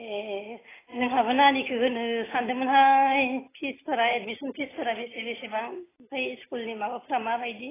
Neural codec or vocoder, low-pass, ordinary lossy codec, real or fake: none; 3.6 kHz; AAC, 16 kbps; real